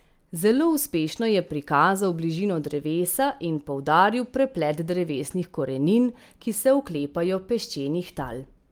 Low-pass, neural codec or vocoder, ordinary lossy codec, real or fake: 19.8 kHz; none; Opus, 32 kbps; real